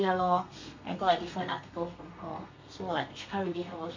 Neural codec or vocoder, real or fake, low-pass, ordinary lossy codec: codec, 32 kHz, 1.9 kbps, SNAC; fake; 7.2 kHz; MP3, 48 kbps